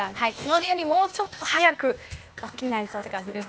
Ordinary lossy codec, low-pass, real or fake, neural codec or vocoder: none; none; fake; codec, 16 kHz, 0.8 kbps, ZipCodec